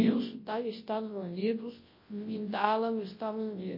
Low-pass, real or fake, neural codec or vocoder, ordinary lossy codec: 5.4 kHz; fake; codec, 24 kHz, 0.9 kbps, WavTokenizer, large speech release; MP3, 24 kbps